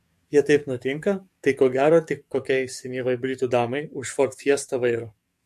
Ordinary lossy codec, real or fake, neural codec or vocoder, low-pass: MP3, 64 kbps; fake; codec, 44.1 kHz, 7.8 kbps, DAC; 14.4 kHz